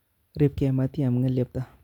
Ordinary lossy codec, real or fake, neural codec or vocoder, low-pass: none; real; none; 19.8 kHz